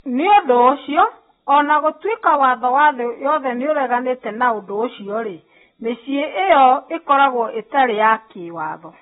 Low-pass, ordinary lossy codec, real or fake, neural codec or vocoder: 19.8 kHz; AAC, 16 kbps; real; none